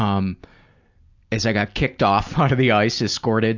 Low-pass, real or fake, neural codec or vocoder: 7.2 kHz; real; none